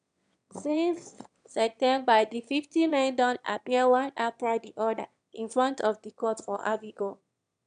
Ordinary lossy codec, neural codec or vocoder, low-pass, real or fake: none; autoencoder, 22.05 kHz, a latent of 192 numbers a frame, VITS, trained on one speaker; 9.9 kHz; fake